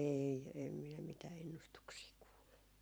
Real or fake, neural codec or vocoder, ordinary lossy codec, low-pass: fake; vocoder, 44.1 kHz, 128 mel bands every 512 samples, BigVGAN v2; none; none